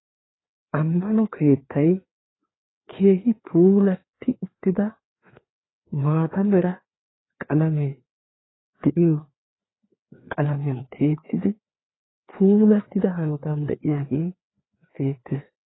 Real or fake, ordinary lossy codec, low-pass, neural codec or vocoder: fake; AAC, 16 kbps; 7.2 kHz; codec, 16 kHz, 2 kbps, FreqCodec, larger model